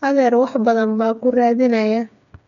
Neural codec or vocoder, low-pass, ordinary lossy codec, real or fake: codec, 16 kHz, 4 kbps, FreqCodec, smaller model; 7.2 kHz; none; fake